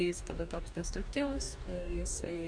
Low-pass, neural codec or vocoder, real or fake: 9.9 kHz; codec, 44.1 kHz, 2.6 kbps, DAC; fake